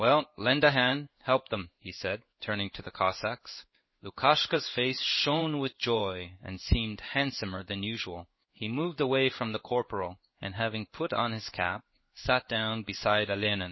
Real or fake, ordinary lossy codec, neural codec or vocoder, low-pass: fake; MP3, 24 kbps; codec, 16 kHz in and 24 kHz out, 1 kbps, XY-Tokenizer; 7.2 kHz